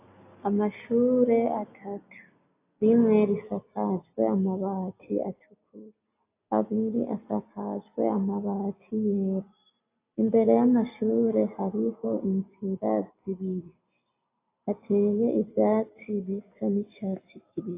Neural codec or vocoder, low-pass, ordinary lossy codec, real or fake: none; 3.6 kHz; AAC, 24 kbps; real